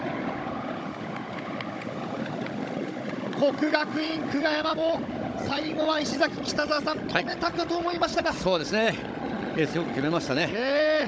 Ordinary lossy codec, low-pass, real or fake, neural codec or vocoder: none; none; fake; codec, 16 kHz, 16 kbps, FunCodec, trained on Chinese and English, 50 frames a second